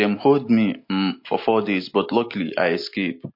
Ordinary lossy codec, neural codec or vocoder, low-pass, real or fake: MP3, 32 kbps; none; 5.4 kHz; real